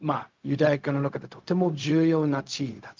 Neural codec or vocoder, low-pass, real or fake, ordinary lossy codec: codec, 16 kHz, 0.4 kbps, LongCat-Audio-Codec; 7.2 kHz; fake; Opus, 32 kbps